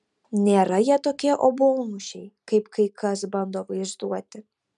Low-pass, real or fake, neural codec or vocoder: 10.8 kHz; real; none